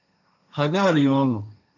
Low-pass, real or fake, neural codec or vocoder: 7.2 kHz; fake; codec, 16 kHz, 1.1 kbps, Voila-Tokenizer